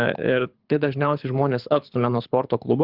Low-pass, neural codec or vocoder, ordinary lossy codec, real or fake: 5.4 kHz; codec, 24 kHz, 6 kbps, HILCodec; Opus, 24 kbps; fake